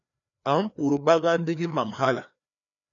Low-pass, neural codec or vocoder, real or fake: 7.2 kHz; codec, 16 kHz, 2 kbps, FreqCodec, larger model; fake